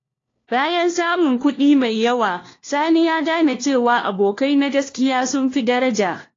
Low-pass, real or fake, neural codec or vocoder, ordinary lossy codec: 7.2 kHz; fake; codec, 16 kHz, 1 kbps, FunCodec, trained on LibriTTS, 50 frames a second; AAC, 32 kbps